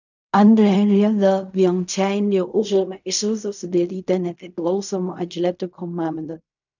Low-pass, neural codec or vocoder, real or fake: 7.2 kHz; codec, 16 kHz in and 24 kHz out, 0.4 kbps, LongCat-Audio-Codec, fine tuned four codebook decoder; fake